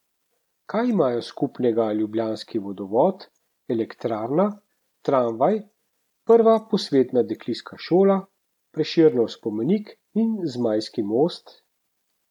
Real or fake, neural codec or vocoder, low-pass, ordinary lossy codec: real; none; 19.8 kHz; none